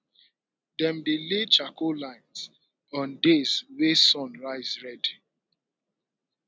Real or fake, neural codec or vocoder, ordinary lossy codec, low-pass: real; none; none; none